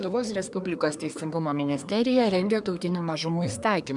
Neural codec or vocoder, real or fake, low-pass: codec, 24 kHz, 1 kbps, SNAC; fake; 10.8 kHz